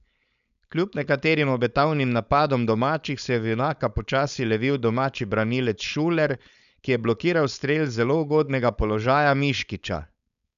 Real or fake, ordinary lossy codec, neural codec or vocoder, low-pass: fake; none; codec, 16 kHz, 4.8 kbps, FACodec; 7.2 kHz